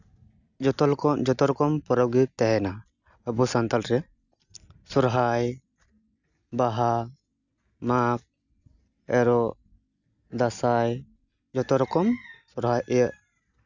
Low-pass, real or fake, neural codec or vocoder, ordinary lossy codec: 7.2 kHz; real; none; none